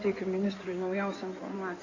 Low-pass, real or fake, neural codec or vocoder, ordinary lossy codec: 7.2 kHz; fake; codec, 16 kHz in and 24 kHz out, 2.2 kbps, FireRedTTS-2 codec; Opus, 64 kbps